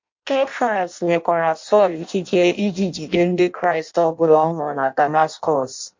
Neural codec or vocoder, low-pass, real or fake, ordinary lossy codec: codec, 16 kHz in and 24 kHz out, 0.6 kbps, FireRedTTS-2 codec; 7.2 kHz; fake; MP3, 48 kbps